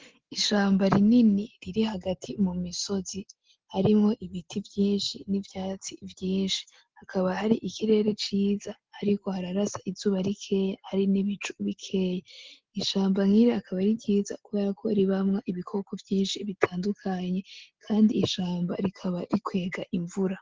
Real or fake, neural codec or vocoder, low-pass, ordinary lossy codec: real; none; 7.2 kHz; Opus, 16 kbps